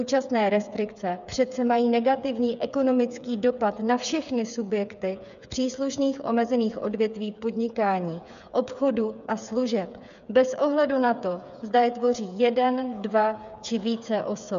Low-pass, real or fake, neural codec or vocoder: 7.2 kHz; fake; codec, 16 kHz, 8 kbps, FreqCodec, smaller model